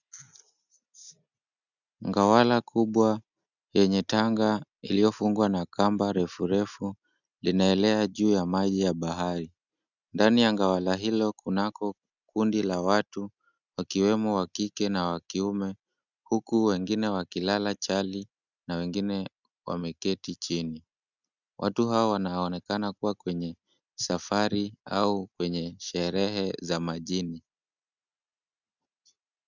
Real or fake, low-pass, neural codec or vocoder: real; 7.2 kHz; none